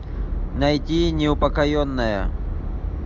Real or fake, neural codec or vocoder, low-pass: real; none; 7.2 kHz